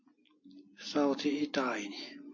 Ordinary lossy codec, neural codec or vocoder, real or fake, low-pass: MP3, 32 kbps; none; real; 7.2 kHz